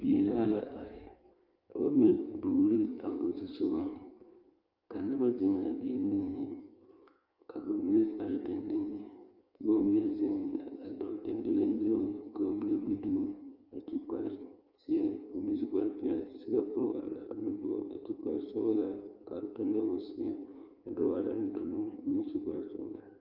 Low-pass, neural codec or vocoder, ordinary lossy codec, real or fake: 5.4 kHz; codec, 16 kHz in and 24 kHz out, 1.1 kbps, FireRedTTS-2 codec; Opus, 24 kbps; fake